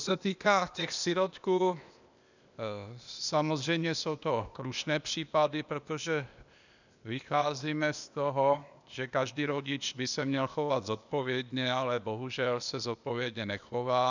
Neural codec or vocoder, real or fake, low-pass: codec, 16 kHz, 0.8 kbps, ZipCodec; fake; 7.2 kHz